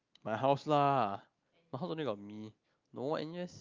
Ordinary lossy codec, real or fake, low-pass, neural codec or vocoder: Opus, 32 kbps; real; 7.2 kHz; none